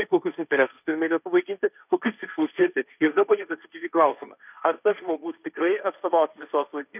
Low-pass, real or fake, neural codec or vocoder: 3.6 kHz; fake; codec, 16 kHz, 1.1 kbps, Voila-Tokenizer